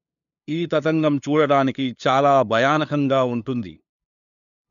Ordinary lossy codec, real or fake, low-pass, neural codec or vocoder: none; fake; 7.2 kHz; codec, 16 kHz, 2 kbps, FunCodec, trained on LibriTTS, 25 frames a second